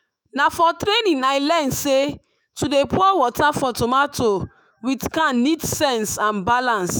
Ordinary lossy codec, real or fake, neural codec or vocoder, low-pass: none; fake; autoencoder, 48 kHz, 128 numbers a frame, DAC-VAE, trained on Japanese speech; none